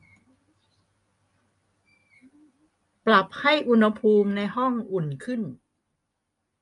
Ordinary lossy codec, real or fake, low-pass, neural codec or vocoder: AAC, 48 kbps; real; 10.8 kHz; none